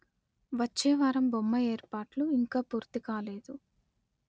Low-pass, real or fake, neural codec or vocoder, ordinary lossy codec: none; real; none; none